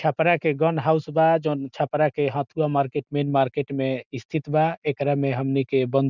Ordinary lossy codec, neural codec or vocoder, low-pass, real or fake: none; none; 7.2 kHz; real